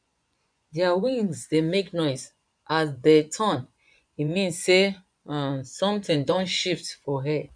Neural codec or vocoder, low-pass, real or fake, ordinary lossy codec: vocoder, 24 kHz, 100 mel bands, Vocos; 9.9 kHz; fake; none